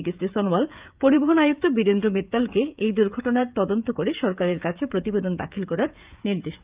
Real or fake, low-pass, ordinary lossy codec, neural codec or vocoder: fake; 3.6 kHz; Opus, 24 kbps; codec, 16 kHz, 16 kbps, FreqCodec, smaller model